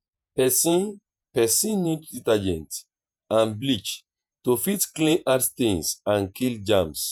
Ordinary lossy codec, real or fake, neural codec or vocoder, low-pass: none; fake; vocoder, 48 kHz, 128 mel bands, Vocos; none